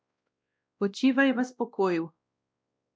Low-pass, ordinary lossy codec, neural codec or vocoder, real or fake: none; none; codec, 16 kHz, 1 kbps, X-Codec, WavLM features, trained on Multilingual LibriSpeech; fake